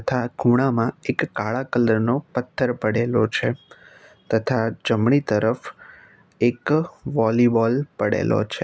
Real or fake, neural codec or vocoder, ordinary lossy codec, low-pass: real; none; none; none